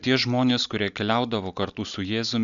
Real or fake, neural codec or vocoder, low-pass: real; none; 7.2 kHz